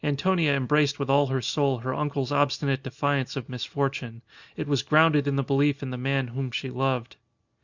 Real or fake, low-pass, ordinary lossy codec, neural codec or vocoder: real; 7.2 kHz; Opus, 64 kbps; none